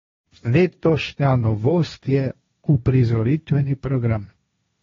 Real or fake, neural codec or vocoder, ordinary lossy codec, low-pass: fake; codec, 16 kHz, 1.1 kbps, Voila-Tokenizer; AAC, 24 kbps; 7.2 kHz